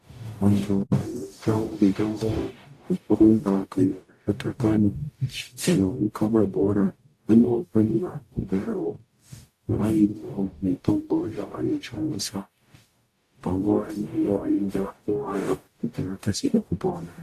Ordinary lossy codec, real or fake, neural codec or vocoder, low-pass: AAC, 64 kbps; fake; codec, 44.1 kHz, 0.9 kbps, DAC; 14.4 kHz